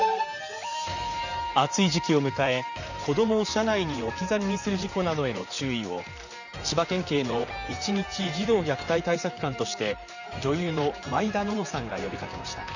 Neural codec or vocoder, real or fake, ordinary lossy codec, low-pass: vocoder, 44.1 kHz, 128 mel bands, Pupu-Vocoder; fake; none; 7.2 kHz